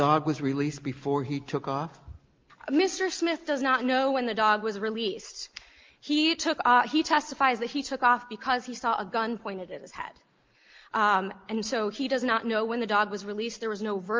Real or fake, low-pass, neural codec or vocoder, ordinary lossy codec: real; 7.2 kHz; none; Opus, 32 kbps